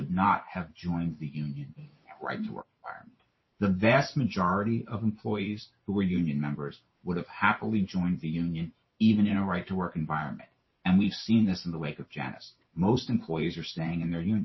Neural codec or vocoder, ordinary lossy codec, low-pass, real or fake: vocoder, 44.1 kHz, 128 mel bands every 512 samples, BigVGAN v2; MP3, 24 kbps; 7.2 kHz; fake